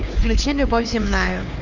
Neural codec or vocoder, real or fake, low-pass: codec, 16 kHz, 2 kbps, X-Codec, HuBERT features, trained on LibriSpeech; fake; 7.2 kHz